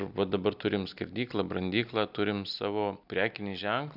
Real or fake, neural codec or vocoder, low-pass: real; none; 5.4 kHz